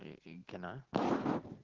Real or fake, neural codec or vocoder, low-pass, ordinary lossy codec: real; none; 7.2 kHz; Opus, 16 kbps